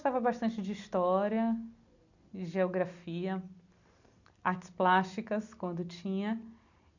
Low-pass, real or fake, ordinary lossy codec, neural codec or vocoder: 7.2 kHz; real; none; none